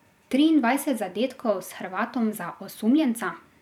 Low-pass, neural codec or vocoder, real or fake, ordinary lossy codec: 19.8 kHz; vocoder, 44.1 kHz, 128 mel bands every 512 samples, BigVGAN v2; fake; none